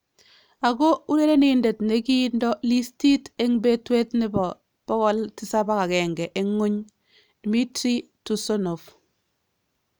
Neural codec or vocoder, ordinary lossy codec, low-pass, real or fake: none; none; none; real